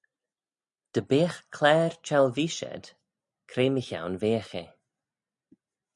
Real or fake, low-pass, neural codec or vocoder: real; 10.8 kHz; none